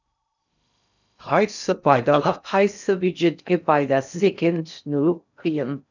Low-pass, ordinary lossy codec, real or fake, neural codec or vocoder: 7.2 kHz; none; fake; codec, 16 kHz in and 24 kHz out, 0.6 kbps, FocalCodec, streaming, 2048 codes